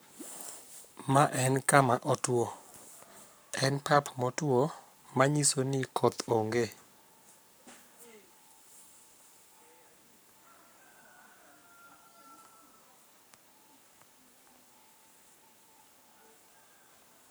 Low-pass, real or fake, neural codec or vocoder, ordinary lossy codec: none; fake; codec, 44.1 kHz, 7.8 kbps, Pupu-Codec; none